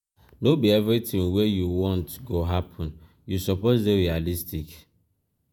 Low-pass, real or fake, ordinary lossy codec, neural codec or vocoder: none; fake; none; vocoder, 48 kHz, 128 mel bands, Vocos